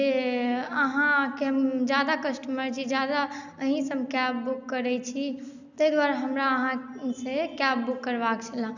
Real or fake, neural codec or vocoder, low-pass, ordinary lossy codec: real; none; 7.2 kHz; none